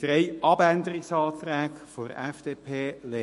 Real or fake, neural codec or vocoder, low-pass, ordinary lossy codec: fake; autoencoder, 48 kHz, 128 numbers a frame, DAC-VAE, trained on Japanese speech; 14.4 kHz; MP3, 48 kbps